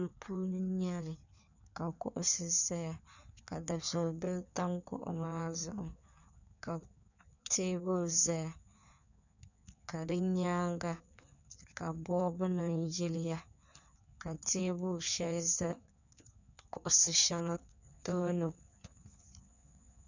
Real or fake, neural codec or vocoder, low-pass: fake; codec, 16 kHz in and 24 kHz out, 1.1 kbps, FireRedTTS-2 codec; 7.2 kHz